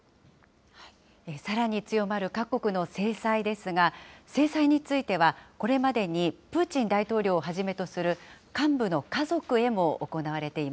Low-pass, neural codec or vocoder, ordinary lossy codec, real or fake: none; none; none; real